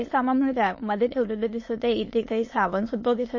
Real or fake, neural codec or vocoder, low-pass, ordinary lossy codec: fake; autoencoder, 22.05 kHz, a latent of 192 numbers a frame, VITS, trained on many speakers; 7.2 kHz; MP3, 32 kbps